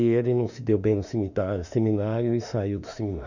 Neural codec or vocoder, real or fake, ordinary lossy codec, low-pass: autoencoder, 48 kHz, 32 numbers a frame, DAC-VAE, trained on Japanese speech; fake; none; 7.2 kHz